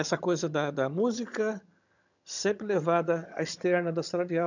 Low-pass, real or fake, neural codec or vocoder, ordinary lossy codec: 7.2 kHz; fake; vocoder, 22.05 kHz, 80 mel bands, HiFi-GAN; none